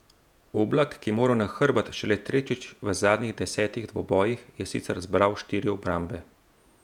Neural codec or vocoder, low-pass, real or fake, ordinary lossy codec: vocoder, 48 kHz, 128 mel bands, Vocos; 19.8 kHz; fake; none